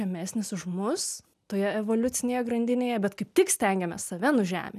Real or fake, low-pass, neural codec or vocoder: real; 14.4 kHz; none